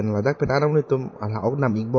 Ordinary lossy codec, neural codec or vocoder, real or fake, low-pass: MP3, 32 kbps; none; real; 7.2 kHz